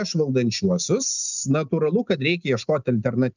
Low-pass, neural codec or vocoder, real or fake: 7.2 kHz; none; real